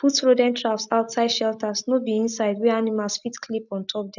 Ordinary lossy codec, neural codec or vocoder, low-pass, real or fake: none; none; 7.2 kHz; real